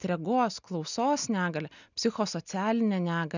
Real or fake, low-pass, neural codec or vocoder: real; 7.2 kHz; none